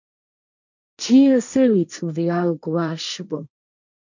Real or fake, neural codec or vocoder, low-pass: fake; codec, 16 kHz, 1.1 kbps, Voila-Tokenizer; 7.2 kHz